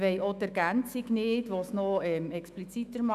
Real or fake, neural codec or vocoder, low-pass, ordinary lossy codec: fake; autoencoder, 48 kHz, 128 numbers a frame, DAC-VAE, trained on Japanese speech; 14.4 kHz; none